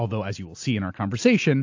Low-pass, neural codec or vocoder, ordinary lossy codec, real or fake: 7.2 kHz; none; AAC, 48 kbps; real